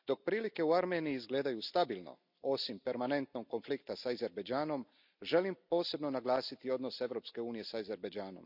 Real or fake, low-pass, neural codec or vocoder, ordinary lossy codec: real; 5.4 kHz; none; none